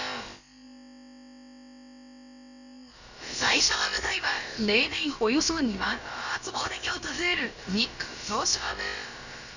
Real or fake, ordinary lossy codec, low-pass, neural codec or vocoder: fake; none; 7.2 kHz; codec, 16 kHz, about 1 kbps, DyCAST, with the encoder's durations